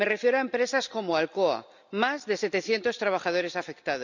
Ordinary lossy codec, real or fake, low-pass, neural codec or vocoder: none; real; 7.2 kHz; none